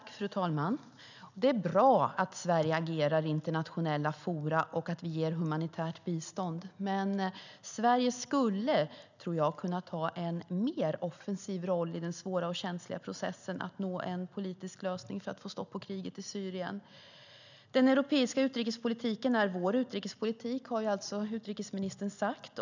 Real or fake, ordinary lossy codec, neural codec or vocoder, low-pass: real; none; none; 7.2 kHz